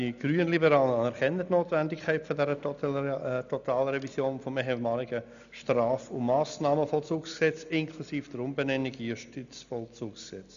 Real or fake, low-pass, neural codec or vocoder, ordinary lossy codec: real; 7.2 kHz; none; none